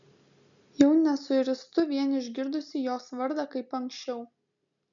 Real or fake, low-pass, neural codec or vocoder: real; 7.2 kHz; none